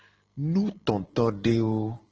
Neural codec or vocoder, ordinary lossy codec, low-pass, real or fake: none; Opus, 16 kbps; 7.2 kHz; real